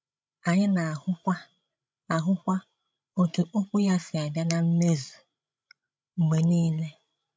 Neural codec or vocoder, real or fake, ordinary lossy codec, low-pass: codec, 16 kHz, 16 kbps, FreqCodec, larger model; fake; none; none